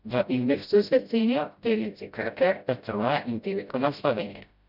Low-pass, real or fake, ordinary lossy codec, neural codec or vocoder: 5.4 kHz; fake; none; codec, 16 kHz, 0.5 kbps, FreqCodec, smaller model